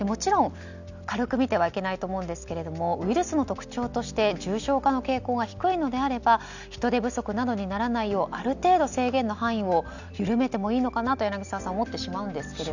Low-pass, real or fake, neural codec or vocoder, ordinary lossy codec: 7.2 kHz; real; none; none